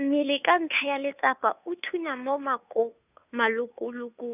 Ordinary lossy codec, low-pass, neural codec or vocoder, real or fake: none; 3.6 kHz; codec, 16 kHz, 2 kbps, FunCodec, trained on Chinese and English, 25 frames a second; fake